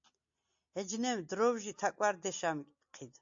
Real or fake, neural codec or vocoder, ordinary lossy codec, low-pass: real; none; AAC, 64 kbps; 7.2 kHz